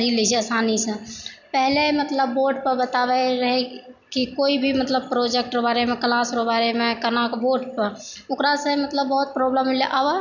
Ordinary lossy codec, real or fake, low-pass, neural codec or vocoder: none; real; 7.2 kHz; none